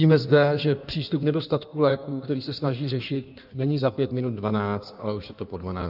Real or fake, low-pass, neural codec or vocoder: fake; 5.4 kHz; codec, 16 kHz in and 24 kHz out, 1.1 kbps, FireRedTTS-2 codec